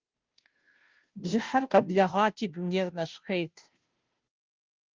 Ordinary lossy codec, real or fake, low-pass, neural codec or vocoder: Opus, 32 kbps; fake; 7.2 kHz; codec, 16 kHz, 0.5 kbps, FunCodec, trained on Chinese and English, 25 frames a second